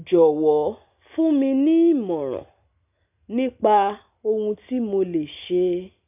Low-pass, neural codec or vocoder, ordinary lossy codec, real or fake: 3.6 kHz; none; none; real